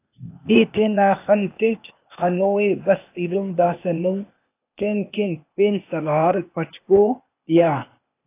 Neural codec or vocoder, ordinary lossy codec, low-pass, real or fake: codec, 16 kHz, 0.8 kbps, ZipCodec; AAC, 24 kbps; 3.6 kHz; fake